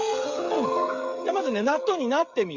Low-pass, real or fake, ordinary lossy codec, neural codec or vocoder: 7.2 kHz; fake; Opus, 64 kbps; codec, 16 kHz, 8 kbps, FreqCodec, smaller model